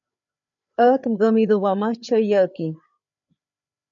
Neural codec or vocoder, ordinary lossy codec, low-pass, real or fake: codec, 16 kHz, 4 kbps, FreqCodec, larger model; MP3, 96 kbps; 7.2 kHz; fake